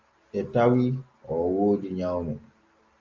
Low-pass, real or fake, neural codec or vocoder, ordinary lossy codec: 7.2 kHz; real; none; Opus, 32 kbps